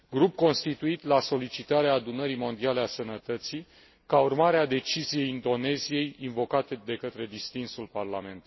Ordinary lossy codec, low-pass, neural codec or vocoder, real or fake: MP3, 24 kbps; 7.2 kHz; none; real